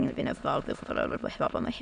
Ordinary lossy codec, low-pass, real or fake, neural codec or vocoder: Opus, 64 kbps; 9.9 kHz; fake; autoencoder, 22.05 kHz, a latent of 192 numbers a frame, VITS, trained on many speakers